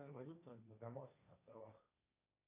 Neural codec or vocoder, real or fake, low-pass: codec, 16 kHz, 1.1 kbps, Voila-Tokenizer; fake; 3.6 kHz